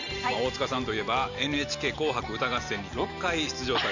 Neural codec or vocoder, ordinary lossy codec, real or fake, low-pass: none; none; real; 7.2 kHz